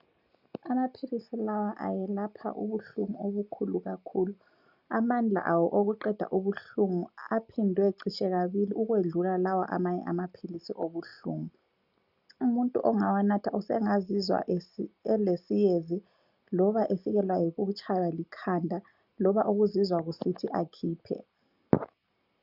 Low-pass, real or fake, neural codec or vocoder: 5.4 kHz; real; none